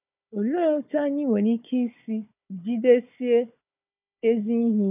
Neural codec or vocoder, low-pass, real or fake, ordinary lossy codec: codec, 16 kHz, 4 kbps, FunCodec, trained on Chinese and English, 50 frames a second; 3.6 kHz; fake; none